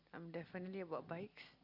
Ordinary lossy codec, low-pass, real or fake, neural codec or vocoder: Opus, 64 kbps; 5.4 kHz; real; none